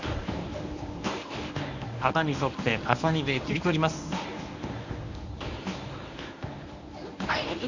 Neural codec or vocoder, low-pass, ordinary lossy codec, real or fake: codec, 24 kHz, 0.9 kbps, WavTokenizer, medium speech release version 1; 7.2 kHz; none; fake